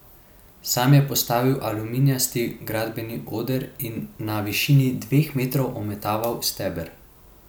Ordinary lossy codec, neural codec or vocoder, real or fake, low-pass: none; none; real; none